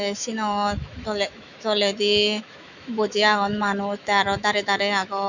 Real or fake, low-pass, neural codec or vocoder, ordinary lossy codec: fake; 7.2 kHz; autoencoder, 48 kHz, 128 numbers a frame, DAC-VAE, trained on Japanese speech; none